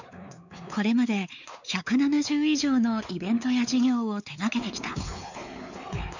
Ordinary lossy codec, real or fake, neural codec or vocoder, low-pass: none; fake; codec, 16 kHz, 4 kbps, X-Codec, WavLM features, trained on Multilingual LibriSpeech; 7.2 kHz